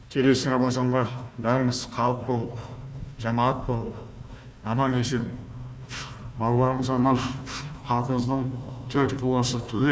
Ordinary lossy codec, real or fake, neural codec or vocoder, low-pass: none; fake; codec, 16 kHz, 1 kbps, FunCodec, trained on Chinese and English, 50 frames a second; none